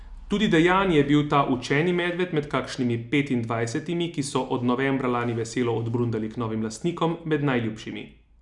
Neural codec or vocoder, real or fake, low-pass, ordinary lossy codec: none; real; 10.8 kHz; none